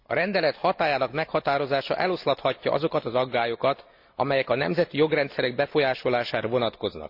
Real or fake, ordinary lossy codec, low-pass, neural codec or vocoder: real; Opus, 64 kbps; 5.4 kHz; none